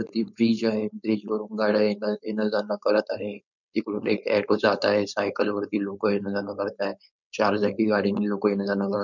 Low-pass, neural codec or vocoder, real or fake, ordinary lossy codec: 7.2 kHz; codec, 16 kHz, 4.8 kbps, FACodec; fake; none